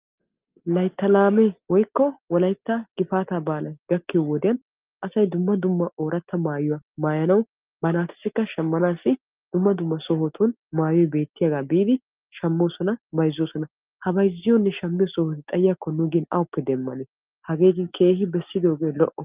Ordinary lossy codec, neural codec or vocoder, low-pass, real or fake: Opus, 24 kbps; none; 3.6 kHz; real